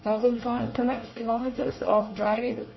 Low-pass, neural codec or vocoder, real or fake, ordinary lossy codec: 7.2 kHz; codec, 24 kHz, 1 kbps, SNAC; fake; MP3, 24 kbps